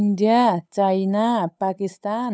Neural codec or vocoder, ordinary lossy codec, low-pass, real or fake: codec, 16 kHz, 8 kbps, FunCodec, trained on Chinese and English, 25 frames a second; none; none; fake